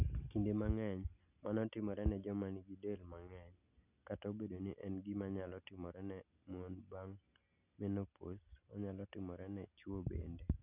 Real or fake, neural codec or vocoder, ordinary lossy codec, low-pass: real; none; none; 3.6 kHz